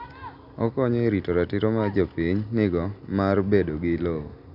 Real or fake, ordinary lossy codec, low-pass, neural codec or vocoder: real; none; 5.4 kHz; none